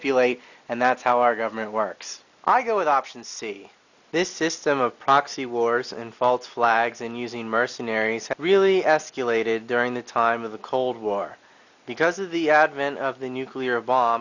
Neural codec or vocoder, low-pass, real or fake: none; 7.2 kHz; real